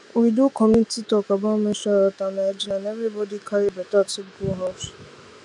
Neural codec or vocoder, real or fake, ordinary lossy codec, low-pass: autoencoder, 48 kHz, 128 numbers a frame, DAC-VAE, trained on Japanese speech; fake; none; 10.8 kHz